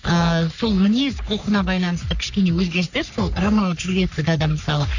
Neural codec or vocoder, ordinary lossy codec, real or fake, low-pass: codec, 44.1 kHz, 3.4 kbps, Pupu-Codec; none; fake; 7.2 kHz